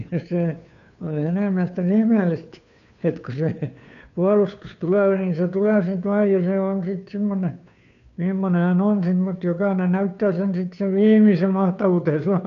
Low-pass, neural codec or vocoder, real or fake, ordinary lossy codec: 7.2 kHz; codec, 16 kHz, 2 kbps, FunCodec, trained on Chinese and English, 25 frames a second; fake; none